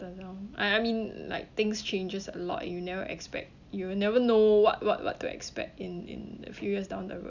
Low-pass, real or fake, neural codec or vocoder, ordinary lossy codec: 7.2 kHz; real; none; none